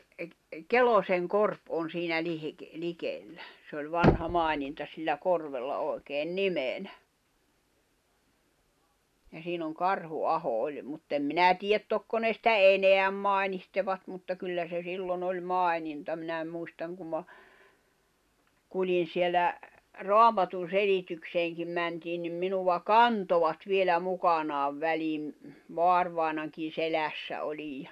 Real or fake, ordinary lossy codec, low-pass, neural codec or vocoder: real; none; 14.4 kHz; none